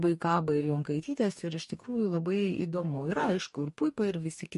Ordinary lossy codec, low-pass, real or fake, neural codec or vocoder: MP3, 48 kbps; 14.4 kHz; fake; codec, 44.1 kHz, 2.6 kbps, DAC